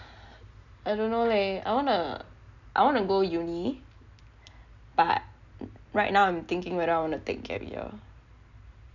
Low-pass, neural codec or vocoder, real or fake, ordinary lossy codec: 7.2 kHz; none; real; none